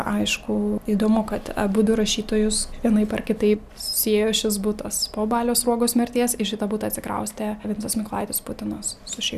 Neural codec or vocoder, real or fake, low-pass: none; real; 14.4 kHz